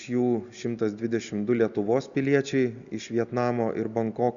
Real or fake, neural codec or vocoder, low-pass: real; none; 7.2 kHz